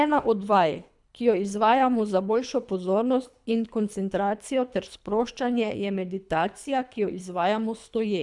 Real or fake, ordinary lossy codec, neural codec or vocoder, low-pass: fake; none; codec, 24 kHz, 3 kbps, HILCodec; 10.8 kHz